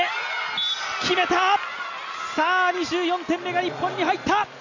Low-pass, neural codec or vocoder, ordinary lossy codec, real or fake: 7.2 kHz; none; none; real